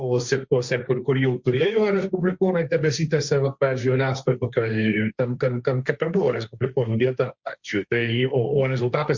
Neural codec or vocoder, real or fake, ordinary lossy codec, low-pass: codec, 16 kHz, 1.1 kbps, Voila-Tokenizer; fake; Opus, 64 kbps; 7.2 kHz